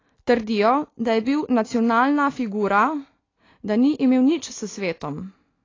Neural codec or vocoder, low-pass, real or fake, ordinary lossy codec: none; 7.2 kHz; real; AAC, 32 kbps